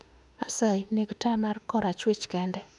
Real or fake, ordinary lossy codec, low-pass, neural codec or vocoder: fake; none; 10.8 kHz; autoencoder, 48 kHz, 32 numbers a frame, DAC-VAE, trained on Japanese speech